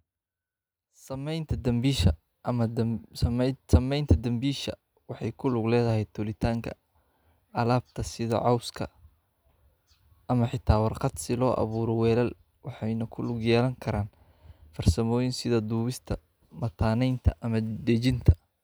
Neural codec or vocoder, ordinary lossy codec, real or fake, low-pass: none; none; real; none